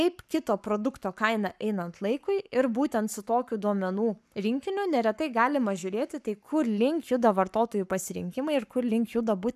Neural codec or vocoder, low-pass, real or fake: codec, 44.1 kHz, 7.8 kbps, Pupu-Codec; 14.4 kHz; fake